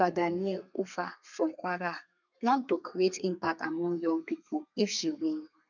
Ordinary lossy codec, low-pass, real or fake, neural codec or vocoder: none; 7.2 kHz; fake; codec, 32 kHz, 1.9 kbps, SNAC